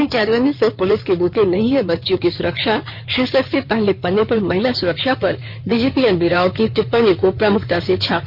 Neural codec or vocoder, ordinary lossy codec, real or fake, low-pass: codec, 16 kHz in and 24 kHz out, 2.2 kbps, FireRedTTS-2 codec; none; fake; 5.4 kHz